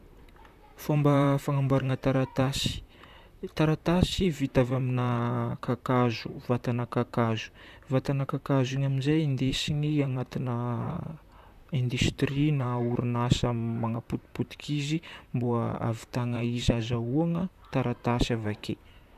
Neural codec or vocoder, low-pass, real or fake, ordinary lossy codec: vocoder, 44.1 kHz, 128 mel bands, Pupu-Vocoder; 14.4 kHz; fake; none